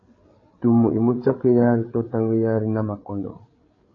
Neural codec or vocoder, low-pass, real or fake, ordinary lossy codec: codec, 16 kHz, 8 kbps, FreqCodec, larger model; 7.2 kHz; fake; AAC, 32 kbps